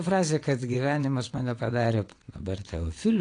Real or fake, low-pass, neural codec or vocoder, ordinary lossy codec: fake; 9.9 kHz; vocoder, 22.05 kHz, 80 mel bands, WaveNeXt; AAC, 48 kbps